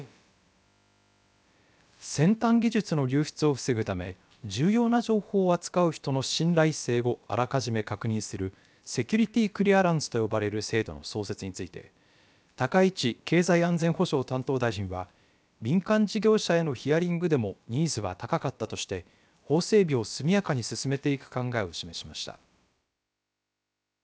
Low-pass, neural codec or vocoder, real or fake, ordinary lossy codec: none; codec, 16 kHz, about 1 kbps, DyCAST, with the encoder's durations; fake; none